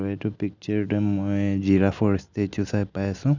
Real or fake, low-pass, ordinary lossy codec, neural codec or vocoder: real; 7.2 kHz; none; none